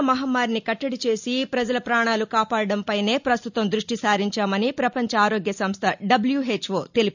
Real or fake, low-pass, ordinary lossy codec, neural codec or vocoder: real; 7.2 kHz; none; none